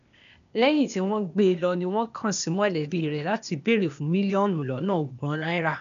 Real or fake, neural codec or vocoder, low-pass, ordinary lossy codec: fake; codec, 16 kHz, 0.8 kbps, ZipCodec; 7.2 kHz; none